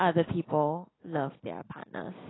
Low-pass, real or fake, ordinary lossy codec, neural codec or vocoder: 7.2 kHz; real; AAC, 16 kbps; none